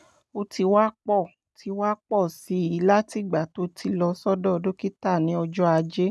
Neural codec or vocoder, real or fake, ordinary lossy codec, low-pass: none; real; none; none